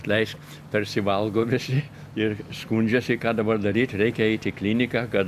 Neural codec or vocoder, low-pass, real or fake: none; 14.4 kHz; real